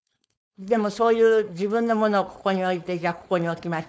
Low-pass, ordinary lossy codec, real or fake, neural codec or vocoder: none; none; fake; codec, 16 kHz, 4.8 kbps, FACodec